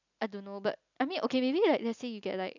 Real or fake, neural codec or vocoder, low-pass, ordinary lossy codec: real; none; 7.2 kHz; none